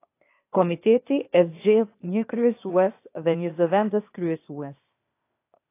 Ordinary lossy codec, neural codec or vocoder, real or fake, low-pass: AAC, 24 kbps; codec, 16 kHz, 0.8 kbps, ZipCodec; fake; 3.6 kHz